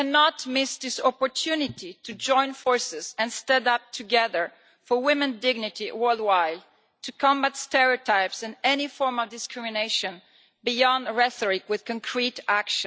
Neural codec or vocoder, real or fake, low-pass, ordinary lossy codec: none; real; none; none